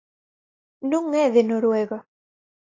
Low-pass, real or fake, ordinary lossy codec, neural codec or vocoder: 7.2 kHz; real; AAC, 32 kbps; none